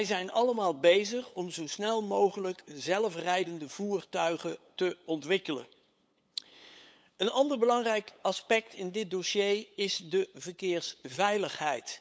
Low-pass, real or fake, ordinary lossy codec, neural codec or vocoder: none; fake; none; codec, 16 kHz, 8 kbps, FunCodec, trained on LibriTTS, 25 frames a second